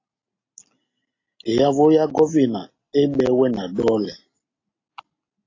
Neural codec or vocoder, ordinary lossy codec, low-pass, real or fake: none; AAC, 32 kbps; 7.2 kHz; real